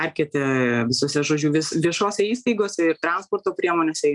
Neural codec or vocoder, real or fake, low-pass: none; real; 10.8 kHz